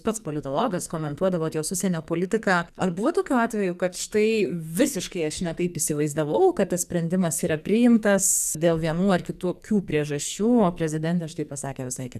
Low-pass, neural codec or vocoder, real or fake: 14.4 kHz; codec, 44.1 kHz, 2.6 kbps, SNAC; fake